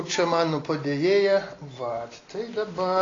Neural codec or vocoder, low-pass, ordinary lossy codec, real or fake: none; 7.2 kHz; AAC, 32 kbps; real